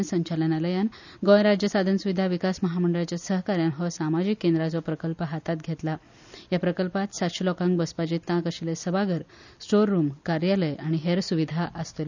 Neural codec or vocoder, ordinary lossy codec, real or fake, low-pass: none; none; real; 7.2 kHz